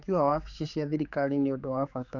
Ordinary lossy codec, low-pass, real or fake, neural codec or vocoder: none; 7.2 kHz; fake; codec, 16 kHz, 4 kbps, X-Codec, HuBERT features, trained on general audio